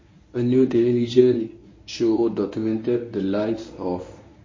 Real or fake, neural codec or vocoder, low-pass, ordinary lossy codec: fake; codec, 24 kHz, 0.9 kbps, WavTokenizer, medium speech release version 1; 7.2 kHz; MP3, 32 kbps